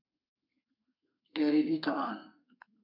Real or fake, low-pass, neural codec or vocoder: fake; 5.4 kHz; codec, 32 kHz, 1.9 kbps, SNAC